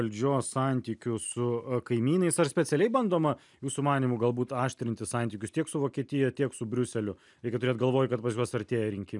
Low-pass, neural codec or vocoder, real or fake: 10.8 kHz; none; real